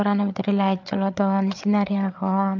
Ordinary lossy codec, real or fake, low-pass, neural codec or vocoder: MP3, 64 kbps; fake; 7.2 kHz; codec, 16 kHz, 8 kbps, FreqCodec, larger model